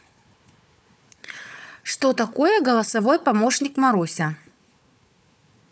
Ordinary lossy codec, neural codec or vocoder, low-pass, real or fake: none; codec, 16 kHz, 4 kbps, FunCodec, trained on Chinese and English, 50 frames a second; none; fake